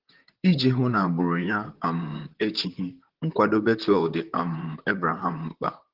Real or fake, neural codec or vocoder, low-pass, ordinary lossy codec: fake; vocoder, 44.1 kHz, 128 mel bands, Pupu-Vocoder; 5.4 kHz; Opus, 16 kbps